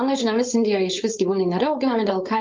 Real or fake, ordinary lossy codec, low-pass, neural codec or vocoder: fake; Opus, 24 kbps; 7.2 kHz; codec, 16 kHz, 4.8 kbps, FACodec